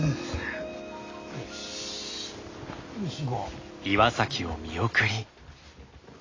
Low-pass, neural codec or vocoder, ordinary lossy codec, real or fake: 7.2 kHz; none; AAC, 32 kbps; real